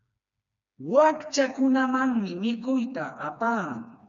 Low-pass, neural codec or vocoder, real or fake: 7.2 kHz; codec, 16 kHz, 2 kbps, FreqCodec, smaller model; fake